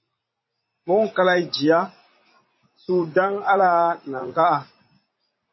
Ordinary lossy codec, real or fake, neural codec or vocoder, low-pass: MP3, 24 kbps; fake; vocoder, 44.1 kHz, 80 mel bands, Vocos; 7.2 kHz